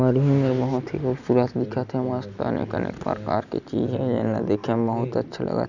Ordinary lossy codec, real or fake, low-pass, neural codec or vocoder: none; real; 7.2 kHz; none